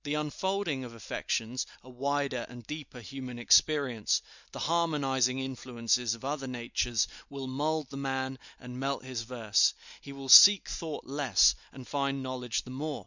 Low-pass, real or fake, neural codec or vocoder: 7.2 kHz; real; none